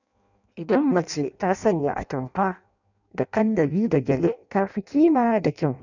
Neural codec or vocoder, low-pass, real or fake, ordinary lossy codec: codec, 16 kHz in and 24 kHz out, 0.6 kbps, FireRedTTS-2 codec; 7.2 kHz; fake; none